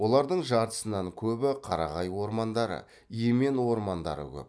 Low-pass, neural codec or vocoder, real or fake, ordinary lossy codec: none; none; real; none